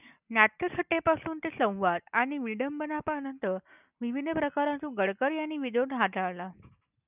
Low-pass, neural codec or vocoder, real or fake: 3.6 kHz; none; real